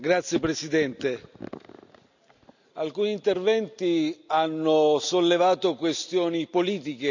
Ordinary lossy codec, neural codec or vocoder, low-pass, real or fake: none; none; 7.2 kHz; real